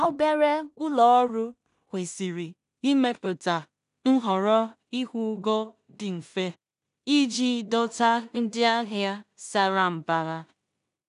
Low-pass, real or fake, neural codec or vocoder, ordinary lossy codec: 10.8 kHz; fake; codec, 16 kHz in and 24 kHz out, 0.4 kbps, LongCat-Audio-Codec, two codebook decoder; none